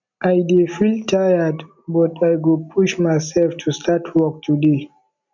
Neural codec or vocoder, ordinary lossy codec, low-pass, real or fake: none; none; 7.2 kHz; real